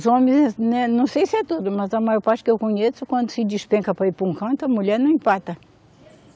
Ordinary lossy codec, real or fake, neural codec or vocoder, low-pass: none; real; none; none